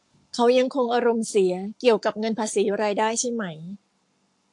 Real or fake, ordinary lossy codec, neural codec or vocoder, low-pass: fake; AAC, 64 kbps; codec, 44.1 kHz, 7.8 kbps, Pupu-Codec; 10.8 kHz